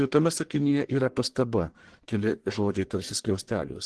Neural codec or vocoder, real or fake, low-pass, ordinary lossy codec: codec, 44.1 kHz, 1.7 kbps, Pupu-Codec; fake; 10.8 kHz; Opus, 16 kbps